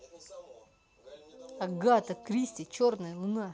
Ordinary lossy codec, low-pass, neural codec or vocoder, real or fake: none; none; none; real